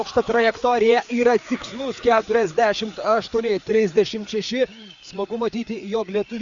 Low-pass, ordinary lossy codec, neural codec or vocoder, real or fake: 7.2 kHz; Opus, 64 kbps; codec, 16 kHz, 4 kbps, FreqCodec, larger model; fake